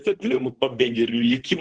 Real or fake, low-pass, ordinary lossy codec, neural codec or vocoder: fake; 9.9 kHz; Opus, 16 kbps; codec, 24 kHz, 0.9 kbps, WavTokenizer, medium speech release version 2